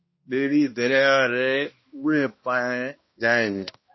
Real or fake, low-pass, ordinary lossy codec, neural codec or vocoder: fake; 7.2 kHz; MP3, 24 kbps; codec, 16 kHz, 1 kbps, X-Codec, HuBERT features, trained on balanced general audio